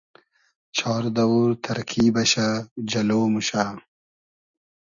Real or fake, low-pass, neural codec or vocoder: real; 7.2 kHz; none